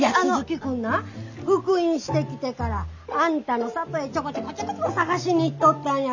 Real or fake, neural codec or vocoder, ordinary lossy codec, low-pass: real; none; none; 7.2 kHz